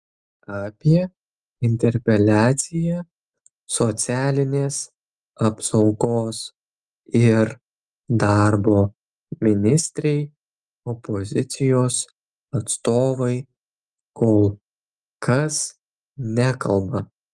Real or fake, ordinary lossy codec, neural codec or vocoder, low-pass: real; Opus, 32 kbps; none; 10.8 kHz